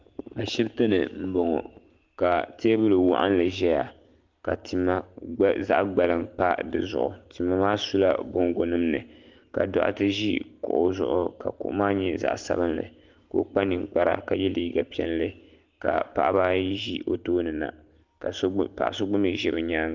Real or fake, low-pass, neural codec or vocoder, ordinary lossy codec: real; 7.2 kHz; none; Opus, 32 kbps